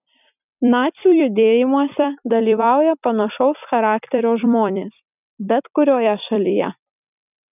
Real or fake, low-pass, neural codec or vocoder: fake; 3.6 kHz; vocoder, 44.1 kHz, 128 mel bands every 256 samples, BigVGAN v2